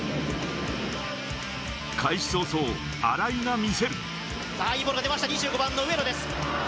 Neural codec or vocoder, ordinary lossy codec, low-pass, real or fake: none; none; none; real